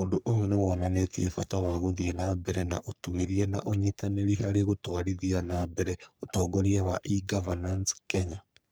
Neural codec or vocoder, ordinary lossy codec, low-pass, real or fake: codec, 44.1 kHz, 3.4 kbps, Pupu-Codec; none; none; fake